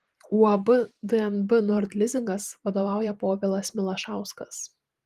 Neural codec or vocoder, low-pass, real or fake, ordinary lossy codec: vocoder, 44.1 kHz, 128 mel bands every 512 samples, BigVGAN v2; 14.4 kHz; fake; Opus, 24 kbps